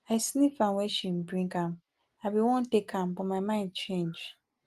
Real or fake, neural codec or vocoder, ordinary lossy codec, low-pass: real; none; Opus, 24 kbps; 14.4 kHz